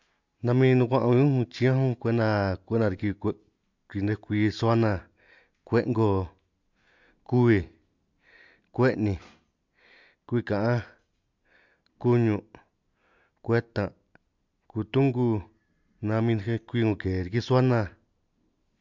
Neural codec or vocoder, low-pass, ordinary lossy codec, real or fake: none; 7.2 kHz; MP3, 64 kbps; real